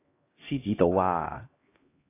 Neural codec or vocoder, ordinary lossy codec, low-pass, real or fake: codec, 16 kHz, 1 kbps, X-Codec, HuBERT features, trained on LibriSpeech; AAC, 24 kbps; 3.6 kHz; fake